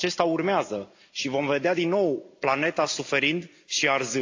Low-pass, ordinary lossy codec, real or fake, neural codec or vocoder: 7.2 kHz; AAC, 48 kbps; real; none